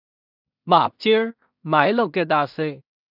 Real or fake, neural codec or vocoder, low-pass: fake; codec, 16 kHz in and 24 kHz out, 0.4 kbps, LongCat-Audio-Codec, two codebook decoder; 5.4 kHz